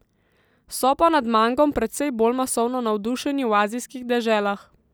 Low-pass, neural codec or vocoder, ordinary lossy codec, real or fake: none; none; none; real